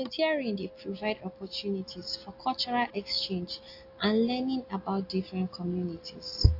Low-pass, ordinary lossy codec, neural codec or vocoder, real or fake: 5.4 kHz; AAC, 32 kbps; none; real